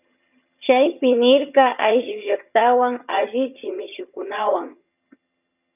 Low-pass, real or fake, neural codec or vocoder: 3.6 kHz; fake; vocoder, 22.05 kHz, 80 mel bands, HiFi-GAN